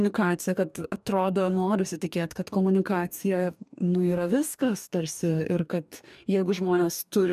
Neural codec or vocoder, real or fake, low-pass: codec, 44.1 kHz, 2.6 kbps, DAC; fake; 14.4 kHz